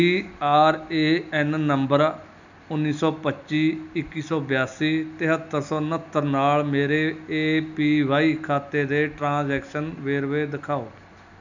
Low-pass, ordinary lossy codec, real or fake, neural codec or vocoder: 7.2 kHz; none; real; none